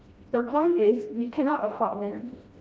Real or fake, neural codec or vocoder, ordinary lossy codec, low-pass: fake; codec, 16 kHz, 1 kbps, FreqCodec, smaller model; none; none